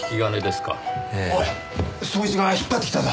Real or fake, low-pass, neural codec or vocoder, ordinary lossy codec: real; none; none; none